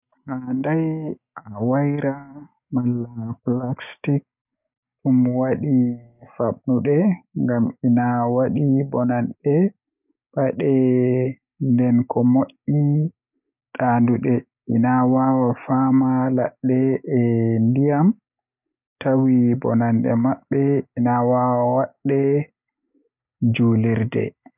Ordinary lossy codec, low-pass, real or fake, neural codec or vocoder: none; 3.6 kHz; real; none